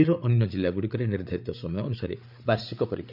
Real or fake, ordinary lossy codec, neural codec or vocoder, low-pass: fake; none; codec, 16 kHz, 8 kbps, FreqCodec, larger model; 5.4 kHz